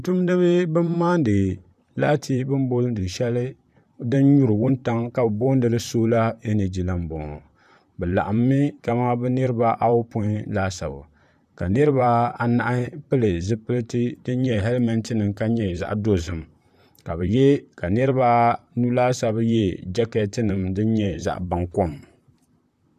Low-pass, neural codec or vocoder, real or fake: 14.4 kHz; vocoder, 44.1 kHz, 128 mel bands, Pupu-Vocoder; fake